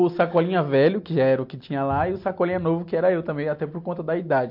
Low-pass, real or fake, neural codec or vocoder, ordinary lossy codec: 5.4 kHz; real; none; MP3, 48 kbps